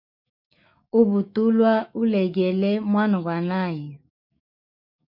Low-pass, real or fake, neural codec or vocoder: 5.4 kHz; fake; autoencoder, 48 kHz, 128 numbers a frame, DAC-VAE, trained on Japanese speech